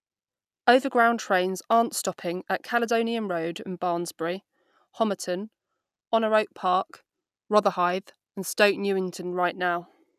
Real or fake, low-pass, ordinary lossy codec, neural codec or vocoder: real; 14.4 kHz; none; none